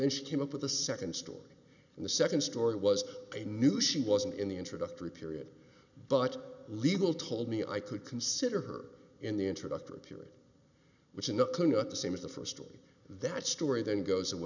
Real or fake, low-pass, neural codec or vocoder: real; 7.2 kHz; none